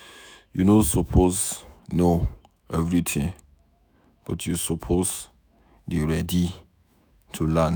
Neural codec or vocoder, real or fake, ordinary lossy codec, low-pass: autoencoder, 48 kHz, 128 numbers a frame, DAC-VAE, trained on Japanese speech; fake; none; none